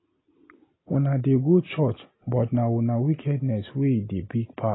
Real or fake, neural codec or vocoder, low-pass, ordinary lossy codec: real; none; 7.2 kHz; AAC, 16 kbps